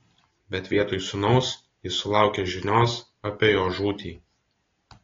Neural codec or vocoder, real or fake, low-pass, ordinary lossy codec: none; real; 7.2 kHz; AAC, 32 kbps